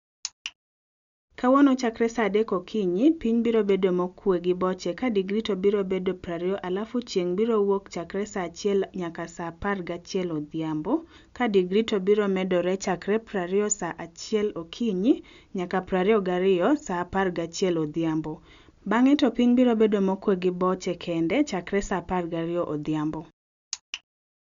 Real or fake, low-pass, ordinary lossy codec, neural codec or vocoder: real; 7.2 kHz; none; none